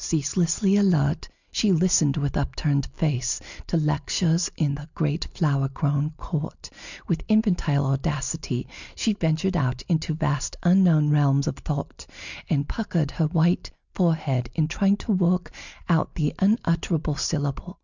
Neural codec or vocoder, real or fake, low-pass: none; real; 7.2 kHz